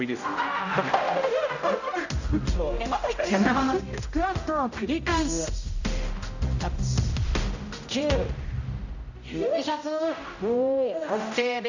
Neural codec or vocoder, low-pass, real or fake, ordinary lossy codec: codec, 16 kHz, 0.5 kbps, X-Codec, HuBERT features, trained on general audio; 7.2 kHz; fake; none